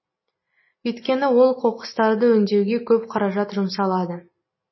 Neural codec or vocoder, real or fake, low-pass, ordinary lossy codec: none; real; 7.2 kHz; MP3, 24 kbps